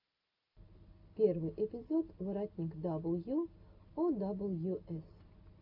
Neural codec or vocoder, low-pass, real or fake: none; 5.4 kHz; real